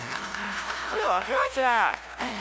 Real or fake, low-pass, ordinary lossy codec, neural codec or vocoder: fake; none; none; codec, 16 kHz, 0.5 kbps, FunCodec, trained on LibriTTS, 25 frames a second